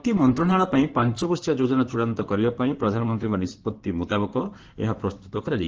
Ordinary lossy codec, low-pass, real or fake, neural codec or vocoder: Opus, 16 kbps; 7.2 kHz; fake; codec, 16 kHz in and 24 kHz out, 2.2 kbps, FireRedTTS-2 codec